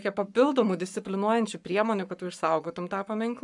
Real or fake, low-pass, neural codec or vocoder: fake; 10.8 kHz; codec, 44.1 kHz, 7.8 kbps, Pupu-Codec